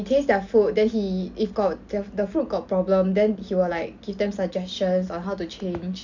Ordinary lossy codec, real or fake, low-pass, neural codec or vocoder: none; real; 7.2 kHz; none